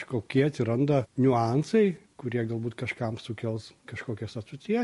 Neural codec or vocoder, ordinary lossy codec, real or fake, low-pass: none; MP3, 48 kbps; real; 14.4 kHz